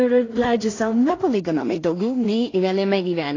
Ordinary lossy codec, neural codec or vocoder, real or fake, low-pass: AAC, 32 kbps; codec, 16 kHz in and 24 kHz out, 0.4 kbps, LongCat-Audio-Codec, two codebook decoder; fake; 7.2 kHz